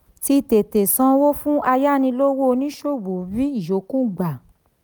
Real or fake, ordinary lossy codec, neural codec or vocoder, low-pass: real; none; none; none